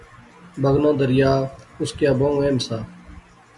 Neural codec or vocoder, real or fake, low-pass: none; real; 10.8 kHz